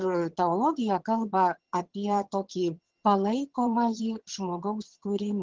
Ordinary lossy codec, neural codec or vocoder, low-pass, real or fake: Opus, 16 kbps; vocoder, 22.05 kHz, 80 mel bands, HiFi-GAN; 7.2 kHz; fake